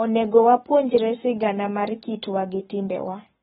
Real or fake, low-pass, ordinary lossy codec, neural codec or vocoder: fake; 19.8 kHz; AAC, 16 kbps; codec, 44.1 kHz, 7.8 kbps, Pupu-Codec